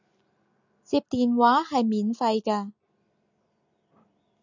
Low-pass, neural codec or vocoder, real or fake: 7.2 kHz; none; real